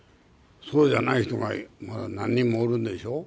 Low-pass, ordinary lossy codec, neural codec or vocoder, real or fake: none; none; none; real